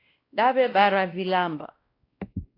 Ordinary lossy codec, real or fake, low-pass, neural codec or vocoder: AAC, 24 kbps; fake; 5.4 kHz; codec, 16 kHz, 1 kbps, X-Codec, WavLM features, trained on Multilingual LibriSpeech